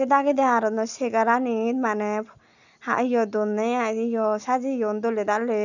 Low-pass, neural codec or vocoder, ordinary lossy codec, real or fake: 7.2 kHz; none; none; real